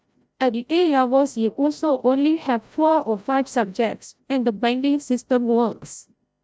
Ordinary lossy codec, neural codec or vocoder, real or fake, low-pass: none; codec, 16 kHz, 0.5 kbps, FreqCodec, larger model; fake; none